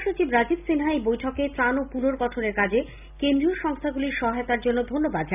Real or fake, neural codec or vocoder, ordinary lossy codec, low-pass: real; none; none; 3.6 kHz